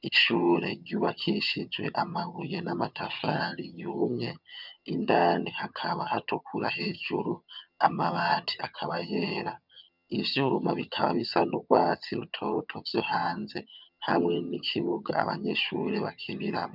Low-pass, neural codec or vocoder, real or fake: 5.4 kHz; vocoder, 22.05 kHz, 80 mel bands, HiFi-GAN; fake